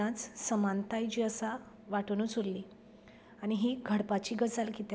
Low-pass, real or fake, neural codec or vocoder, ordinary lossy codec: none; real; none; none